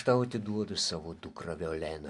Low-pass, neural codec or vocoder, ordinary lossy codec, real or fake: 10.8 kHz; none; MP3, 64 kbps; real